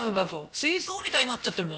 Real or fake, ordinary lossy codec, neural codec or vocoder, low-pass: fake; none; codec, 16 kHz, about 1 kbps, DyCAST, with the encoder's durations; none